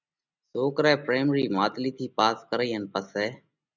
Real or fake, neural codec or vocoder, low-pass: real; none; 7.2 kHz